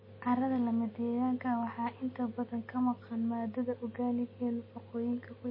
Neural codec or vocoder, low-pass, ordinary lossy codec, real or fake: none; 5.4 kHz; MP3, 24 kbps; real